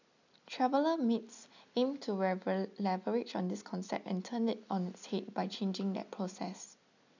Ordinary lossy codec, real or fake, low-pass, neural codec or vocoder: none; real; 7.2 kHz; none